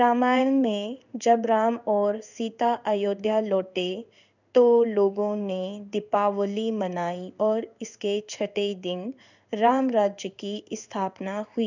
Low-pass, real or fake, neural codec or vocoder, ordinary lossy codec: 7.2 kHz; fake; codec, 16 kHz in and 24 kHz out, 1 kbps, XY-Tokenizer; none